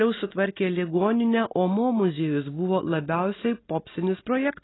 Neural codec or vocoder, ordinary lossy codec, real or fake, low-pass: none; AAC, 16 kbps; real; 7.2 kHz